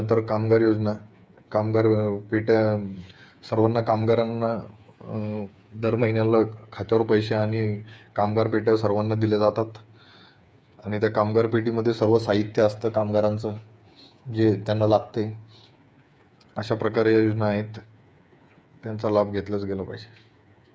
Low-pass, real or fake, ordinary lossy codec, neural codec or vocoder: none; fake; none; codec, 16 kHz, 8 kbps, FreqCodec, smaller model